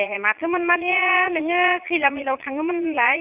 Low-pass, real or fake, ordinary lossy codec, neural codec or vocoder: 3.6 kHz; fake; AAC, 32 kbps; vocoder, 22.05 kHz, 80 mel bands, Vocos